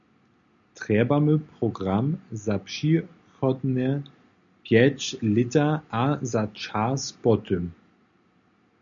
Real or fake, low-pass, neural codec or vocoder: real; 7.2 kHz; none